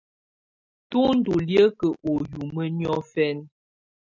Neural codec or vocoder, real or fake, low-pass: none; real; 7.2 kHz